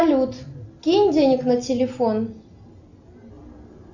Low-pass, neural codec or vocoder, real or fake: 7.2 kHz; none; real